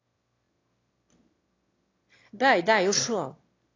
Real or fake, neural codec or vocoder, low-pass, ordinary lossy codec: fake; autoencoder, 22.05 kHz, a latent of 192 numbers a frame, VITS, trained on one speaker; 7.2 kHz; AAC, 32 kbps